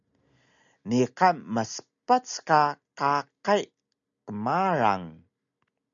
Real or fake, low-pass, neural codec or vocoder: real; 7.2 kHz; none